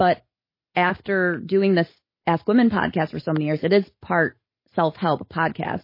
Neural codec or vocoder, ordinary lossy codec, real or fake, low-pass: none; MP3, 24 kbps; real; 5.4 kHz